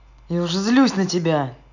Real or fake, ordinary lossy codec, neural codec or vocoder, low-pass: real; none; none; 7.2 kHz